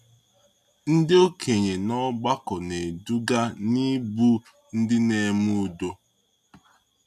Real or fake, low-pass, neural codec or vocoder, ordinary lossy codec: real; 14.4 kHz; none; none